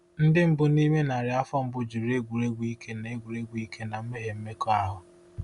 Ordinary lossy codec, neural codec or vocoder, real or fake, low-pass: Opus, 64 kbps; none; real; 10.8 kHz